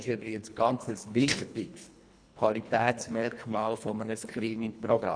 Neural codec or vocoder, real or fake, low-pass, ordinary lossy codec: codec, 24 kHz, 1.5 kbps, HILCodec; fake; 9.9 kHz; none